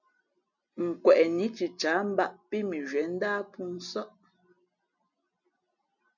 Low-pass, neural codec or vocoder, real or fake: 7.2 kHz; none; real